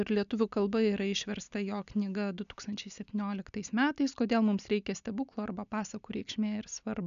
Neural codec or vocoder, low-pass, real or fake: none; 7.2 kHz; real